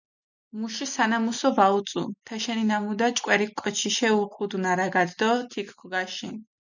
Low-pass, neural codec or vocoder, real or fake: 7.2 kHz; none; real